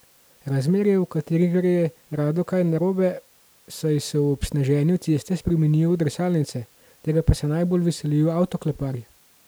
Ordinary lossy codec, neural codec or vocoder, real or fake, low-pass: none; none; real; none